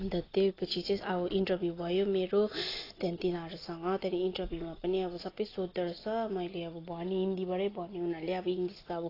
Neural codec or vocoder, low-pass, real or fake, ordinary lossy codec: none; 5.4 kHz; real; AAC, 24 kbps